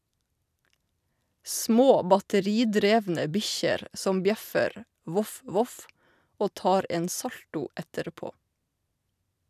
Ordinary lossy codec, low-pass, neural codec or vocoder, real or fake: none; 14.4 kHz; none; real